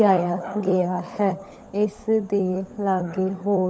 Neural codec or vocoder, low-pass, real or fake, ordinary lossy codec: codec, 16 kHz, 16 kbps, FunCodec, trained on LibriTTS, 50 frames a second; none; fake; none